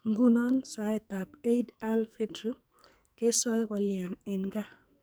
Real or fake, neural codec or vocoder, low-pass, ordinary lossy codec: fake; codec, 44.1 kHz, 2.6 kbps, SNAC; none; none